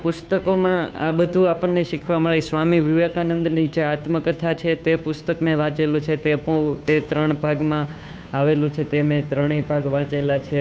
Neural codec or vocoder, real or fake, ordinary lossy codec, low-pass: codec, 16 kHz, 2 kbps, FunCodec, trained on Chinese and English, 25 frames a second; fake; none; none